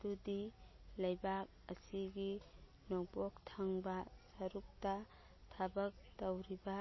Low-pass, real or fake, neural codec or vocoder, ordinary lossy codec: 7.2 kHz; real; none; MP3, 24 kbps